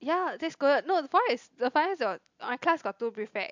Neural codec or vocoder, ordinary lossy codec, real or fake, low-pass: none; MP3, 64 kbps; real; 7.2 kHz